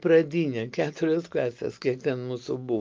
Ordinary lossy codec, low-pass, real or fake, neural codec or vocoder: Opus, 32 kbps; 7.2 kHz; real; none